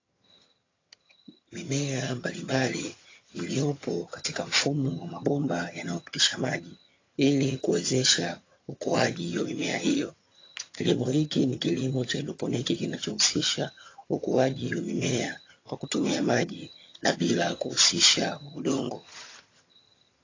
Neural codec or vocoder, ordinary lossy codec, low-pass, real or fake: vocoder, 22.05 kHz, 80 mel bands, HiFi-GAN; AAC, 32 kbps; 7.2 kHz; fake